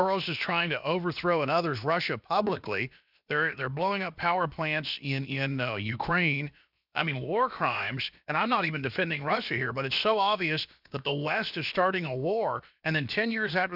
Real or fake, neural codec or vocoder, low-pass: fake; codec, 16 kHz, about 1 kbps, DyCAST, with the encoder's durations; 5.4 kHz